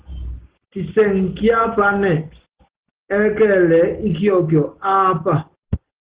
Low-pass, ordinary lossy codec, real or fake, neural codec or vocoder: 3.6 kHz; Opus, 16 kbps; real; none